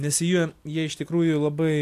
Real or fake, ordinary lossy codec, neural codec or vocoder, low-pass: real; AAC, 64 kbps; none; 14.4 kHz